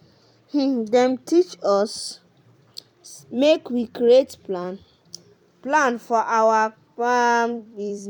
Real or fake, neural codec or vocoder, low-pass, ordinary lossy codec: fake; vocoder, 44.1 kHz, 128 mel bands every 256 samples, BigVGAN v2; 19.8 kHz; none